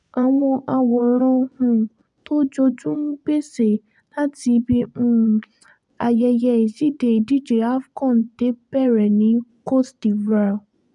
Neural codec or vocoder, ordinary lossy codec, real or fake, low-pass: vocoder, 24 kHz, 100 mel bands, Vocos; none; fake; 10.8 kHz